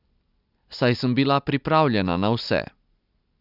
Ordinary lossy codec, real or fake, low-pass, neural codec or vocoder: none; real; 5.4 kHz; none